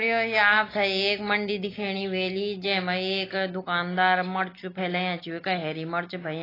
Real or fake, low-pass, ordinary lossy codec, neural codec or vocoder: real; 5.4 kHz; AAC, 24 kbps; none